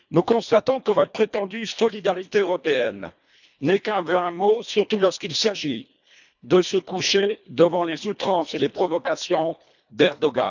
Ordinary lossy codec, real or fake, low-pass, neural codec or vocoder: none; fake; 7.2 kHz; codec, 24 kHz, 1.5 kbps, HILCodec